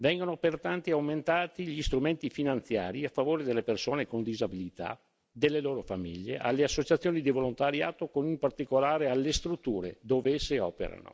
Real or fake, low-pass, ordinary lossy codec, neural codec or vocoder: real; none; none; none